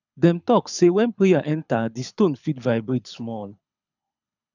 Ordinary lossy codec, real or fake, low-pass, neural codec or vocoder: none; fake; 7.2 kHz; codec, 24 kHz, 6 kbps, HILCodec